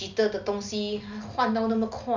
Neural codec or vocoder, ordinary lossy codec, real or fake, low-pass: none; none; real; 7.2 kHz